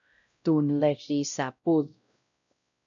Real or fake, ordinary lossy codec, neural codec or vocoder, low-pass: fake; AAC, 64 kbps; codec, 16 kHz, 0.5 kbps, X-Codec, WavLM features, trained on Multilingual LibriSpeech; 7.2 kHz